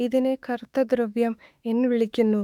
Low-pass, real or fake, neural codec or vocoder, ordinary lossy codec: 19.8 kHz; fake; autoencoder, 48 kHz, 32 numbers a frame, DAC-VAE, trained on Japanese speech; none